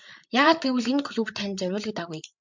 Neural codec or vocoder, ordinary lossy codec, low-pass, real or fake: codec, 16 kHz, 8 kbps, FreqCodec, larger model; MP3, 64 kbps; 7.2 kHz; fake